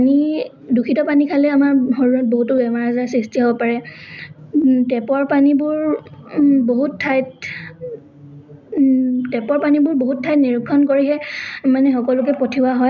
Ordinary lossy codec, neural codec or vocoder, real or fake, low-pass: none; none; real; 7.2 kHz